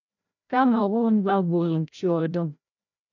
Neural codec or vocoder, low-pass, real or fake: codec, 16 kHz, 0.5 kbps, FreqCodec, larger model; 7.2 kHz; fake